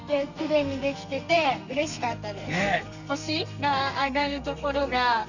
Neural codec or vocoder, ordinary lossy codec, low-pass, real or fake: codec, 32 kHz, 1.9 kbps, SNAC; none; 7.2 kHz; fake